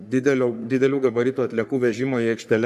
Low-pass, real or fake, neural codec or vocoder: 14.4 kHz; fake; codec, 44.1 kHz, 3.4 kbps, Pupu-Codec